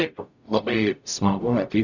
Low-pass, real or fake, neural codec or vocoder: 7.2 kHz; fake; codec, 44.1 kHz, 0.9 kbps, DAC